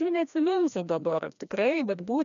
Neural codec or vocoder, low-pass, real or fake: codec, 16 kHz, 1 kbps, FreqCodec, larger model; 7.2 kHz; fake